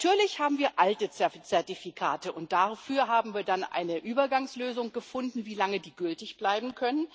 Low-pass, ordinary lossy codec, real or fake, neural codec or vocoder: none; none; real; none